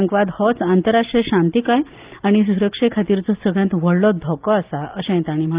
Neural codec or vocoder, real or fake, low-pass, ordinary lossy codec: none; real; 3.6 kHz; Opus, 32 kbps